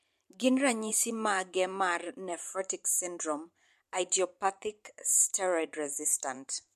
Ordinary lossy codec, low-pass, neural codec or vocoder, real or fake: MP3, 64 kbps; 14.4 kHz; vocoder, 44.1 kHz, 128 mel bands every 512 samples, BigVGAN v2; fake